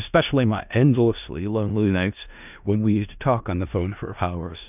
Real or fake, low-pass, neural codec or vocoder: fake; 3.6 kHz; codec, 16 kHz in and 24 kHz out, 0.4 kbps, LongCat-Audio-Codec, four codebook decoder